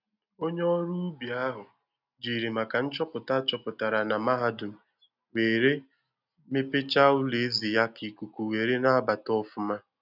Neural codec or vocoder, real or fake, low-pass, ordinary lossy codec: none; real; 5.4 kHz; none